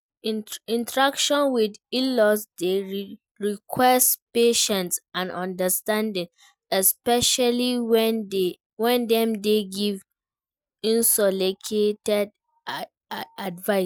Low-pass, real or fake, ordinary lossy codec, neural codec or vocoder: none; real; none; none